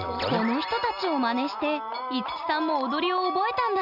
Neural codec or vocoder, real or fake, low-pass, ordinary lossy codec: none; real; 5.4 kHz; none